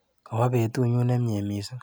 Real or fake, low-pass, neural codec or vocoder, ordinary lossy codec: real; none; none; none